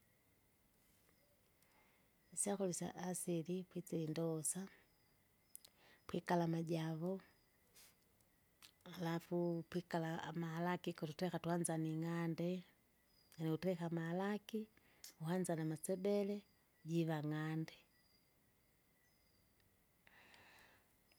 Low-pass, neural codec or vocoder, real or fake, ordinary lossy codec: none; none; real; none